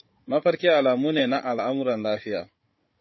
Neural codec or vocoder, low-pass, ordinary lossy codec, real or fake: codec, 16 kHz, 16 kbps, FunCodec, trained on Chinese and English, 50 frames a second; 7.2 kHz; MP3, 24 kbps; fake